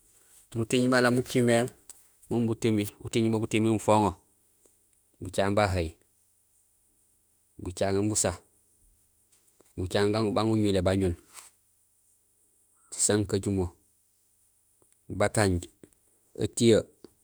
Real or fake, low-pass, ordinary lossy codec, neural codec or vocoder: fake; none; none; autoencoder, 48 kHz, 32 numbers a frame, DAC-VAE, trained on Japanese speech